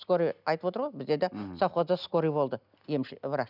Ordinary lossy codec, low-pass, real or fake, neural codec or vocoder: none; 5.4 kHz; real; none